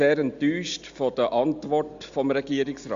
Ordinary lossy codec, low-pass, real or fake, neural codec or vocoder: Opus, 64 kbps; 7.2 kHz; real; none